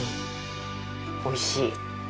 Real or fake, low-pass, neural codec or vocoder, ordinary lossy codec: real; none; none; none